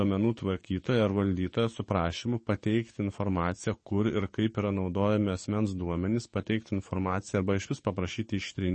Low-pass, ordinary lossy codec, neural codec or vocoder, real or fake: 10.8 kHz; MP3, 32 kbps; codec, 44.1 kHz, 7.8 kbps, DAC; fake